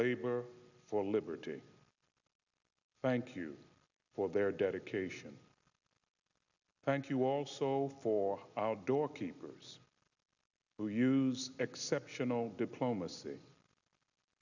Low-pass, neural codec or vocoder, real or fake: 7.2 kHz; none; real